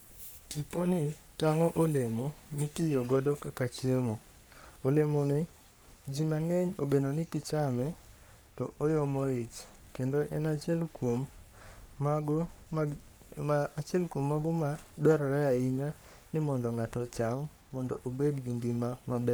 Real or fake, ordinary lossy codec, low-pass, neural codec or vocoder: fake; none; none; codec, 44.1 kHz, 3.4 kbps, Pupu-Codec